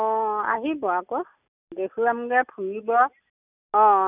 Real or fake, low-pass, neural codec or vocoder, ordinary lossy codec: real; 3.6 kHz; none; none